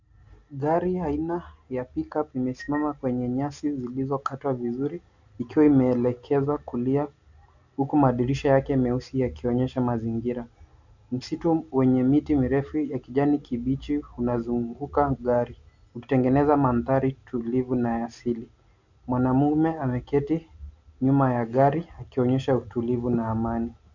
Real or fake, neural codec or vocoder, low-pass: real; none; 7.2 kHz